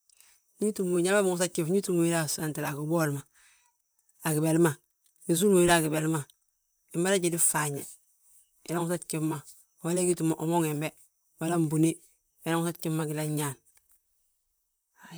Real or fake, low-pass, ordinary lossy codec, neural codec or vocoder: fake; none; none; vocoder, 44.1 kHz, 128 mel bands, Pupu-Vocoder